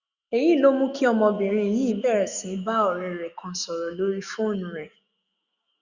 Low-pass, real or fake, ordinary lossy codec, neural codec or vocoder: 7.2 kHz; fake; Opus, 64 kbps; autoencoder, 48 kHz, 128 numbers a frame, DAC-VAE, trained on Japanese speech